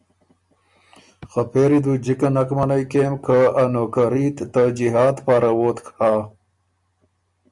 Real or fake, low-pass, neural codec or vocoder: real; 10.8 kHz; none